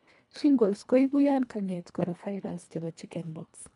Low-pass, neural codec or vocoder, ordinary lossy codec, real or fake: 10.8 kHz; codec, 24 kHz, 1.5 kbps, HILCodec; none; fake